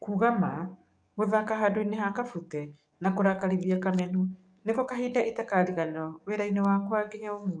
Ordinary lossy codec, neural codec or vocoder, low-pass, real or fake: none; codec, 44.1 kHz, 7.8 kbps, DAC; 9.9 kHz; fake